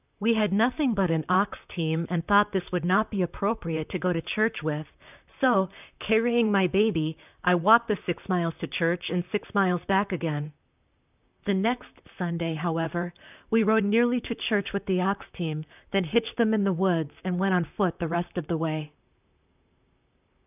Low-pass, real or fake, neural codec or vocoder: 3.6 kHz; fake; vocoder, 44.1 kHz, 128 mel bands, Pupu-Vocoder